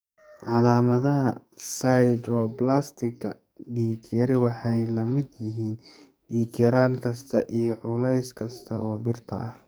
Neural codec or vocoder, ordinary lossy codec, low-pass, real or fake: codec, 44.1 kHz, 2.6 kbps, SNAC; none; none; fake